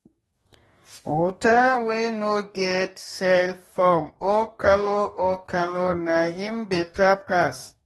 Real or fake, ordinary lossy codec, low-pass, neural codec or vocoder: fake; AAC, 32 kbps; 19.8 kHz; codec, 44.1 kHz, 2.6 kbps, DAC